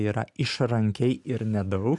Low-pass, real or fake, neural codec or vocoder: 10.8 kHz; real; none